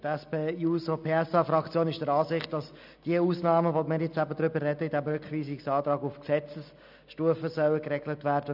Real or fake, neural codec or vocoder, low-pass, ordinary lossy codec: real; none; 5.4 kHz; none